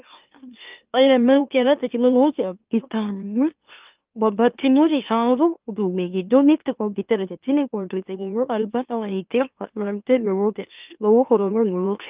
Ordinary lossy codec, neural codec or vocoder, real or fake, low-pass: Opus, 32 kbps; autoencoder, 44.1 kHz, a latent of 192 numbers a frame, MeloTTS; fake; 3.6 kHz